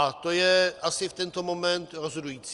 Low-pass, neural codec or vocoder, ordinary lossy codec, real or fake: 10.8 kHz; none; Opus, 32 kbps; real